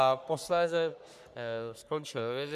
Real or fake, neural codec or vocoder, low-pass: fake; codec, 44.1 kHz, 3.4 kbps, Pupu-Codec; 14.4 kHz